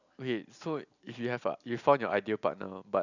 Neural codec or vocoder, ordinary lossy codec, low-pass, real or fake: none; none; 7.2 kHz; real